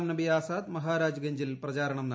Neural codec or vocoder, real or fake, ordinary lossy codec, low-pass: none; real; none; none